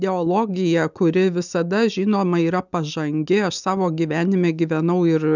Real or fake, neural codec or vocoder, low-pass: real; none; 7.2 kHz